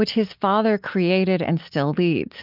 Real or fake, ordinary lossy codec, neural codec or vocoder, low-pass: real; Opus, 24 kbps; none; 5.4 kHz